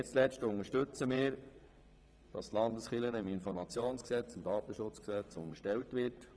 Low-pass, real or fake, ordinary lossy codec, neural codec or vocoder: none; fake; none; vocoder, 22.05 kHz, 80 mel bands, WaveNeXt